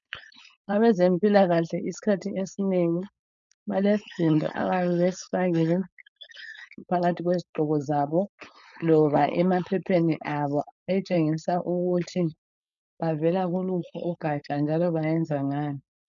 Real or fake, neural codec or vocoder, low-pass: fake; codec, 16 kHz, 4.8 kbps, FACodec; 7.2 kHz